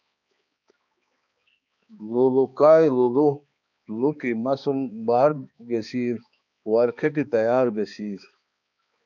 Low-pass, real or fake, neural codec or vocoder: 7.2 kHz; fake; codec, 16 kHz, 2 kbps, X-Codec, HuBERT features, trained on balanced general audio